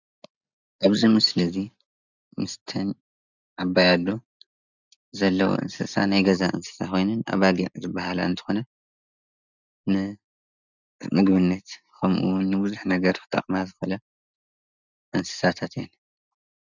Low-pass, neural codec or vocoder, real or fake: 7.2 kHz; none; real